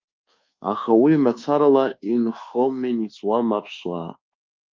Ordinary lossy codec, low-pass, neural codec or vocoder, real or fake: Opus, 24 kbps; 7.2 kHz; codec, 24 kHz, 1.2 kbps, DualCodec; fake